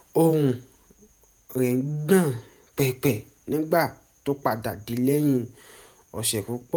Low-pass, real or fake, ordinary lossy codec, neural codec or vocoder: none; fake; none; vocoder, 48 kHz, 128 mel bands, Vocos